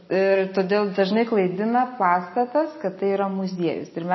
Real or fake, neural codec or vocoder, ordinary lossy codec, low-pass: real; none; MP3, 24 kbps; 7.2 kHz